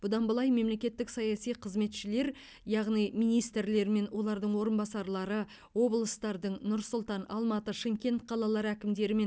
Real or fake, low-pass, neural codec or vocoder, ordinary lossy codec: real; none; none; none